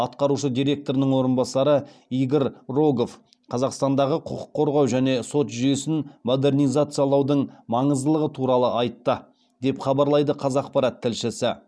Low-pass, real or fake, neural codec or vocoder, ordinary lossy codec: none; real; none; none